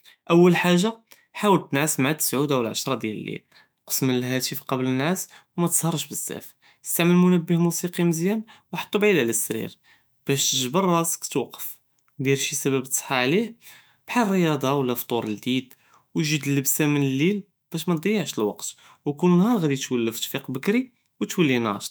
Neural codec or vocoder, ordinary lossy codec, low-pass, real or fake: autoencoder, 48 kHz, 128 numbers a frame, DAC-VAE, trained on Japanese speech; none; none; fake